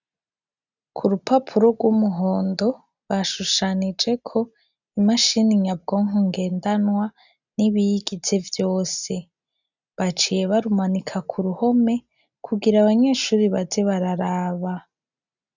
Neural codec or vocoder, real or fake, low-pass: none; real; 7.2 kHz